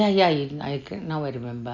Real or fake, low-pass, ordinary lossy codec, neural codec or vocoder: real; 7.2 kHz; none; none